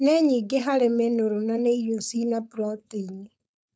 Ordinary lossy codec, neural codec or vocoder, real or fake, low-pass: none; codec, 16 kHz, 4.8 kbps, FACodec; fake; none